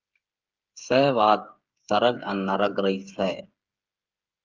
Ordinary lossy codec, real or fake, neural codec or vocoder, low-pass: Opus, 24 kbps; fake; codec, 16 kHz, 8 kbps, FreqCodec, smaller model; 7.2 kHz